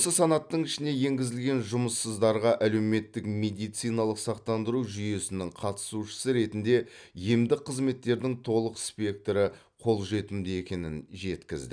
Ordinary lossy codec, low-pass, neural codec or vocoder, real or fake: none; 9.9 kHz; none; real